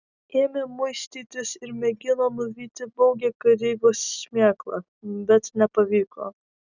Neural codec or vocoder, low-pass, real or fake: none; 7.2 kHz; real